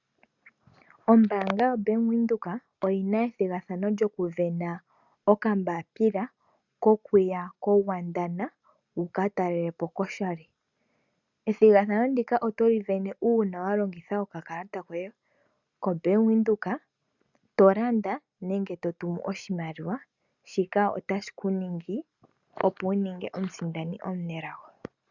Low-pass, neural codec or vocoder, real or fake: 7.2 kHz; none; real